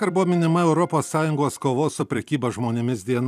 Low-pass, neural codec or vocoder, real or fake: 14.4 kHz; vocoder, 44.1 kHz, 128 mel bands every 256 samples, BigVGAN v2; fake